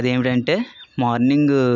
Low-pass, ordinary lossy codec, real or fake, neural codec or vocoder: 7.2 kHz; none; real; none